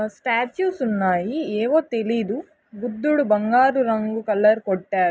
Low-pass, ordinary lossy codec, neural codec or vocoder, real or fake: none; none; none; real